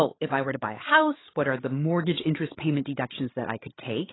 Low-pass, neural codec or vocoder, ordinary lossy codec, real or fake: 7.2 kHz; codec, 16 kHz, 16 kbps, FreqCodec, larger model; AAC, 16 kbps; fake